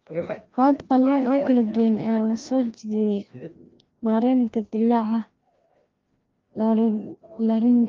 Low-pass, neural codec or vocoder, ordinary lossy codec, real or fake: 7.2 kHz; codec, 16 kHz, 1 kbps, FreqCodec, larger model; Opus, 32 kbps; fake